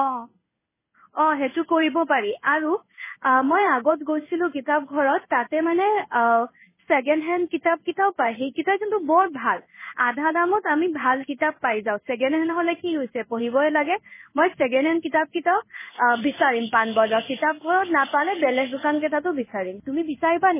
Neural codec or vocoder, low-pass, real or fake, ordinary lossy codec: codec, 16 kHz in and 24 kHz out, 1 kbps, XY-Tokenizer; 3.6 kHz; fake; MP3, 16 kbps